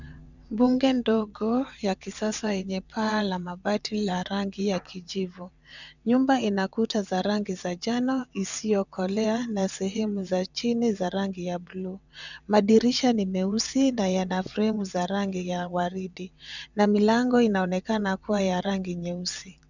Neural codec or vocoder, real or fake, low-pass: vocoder, 22.05 kHz, 80 mel bands, WaveNeXt; fake; 7.2 kHz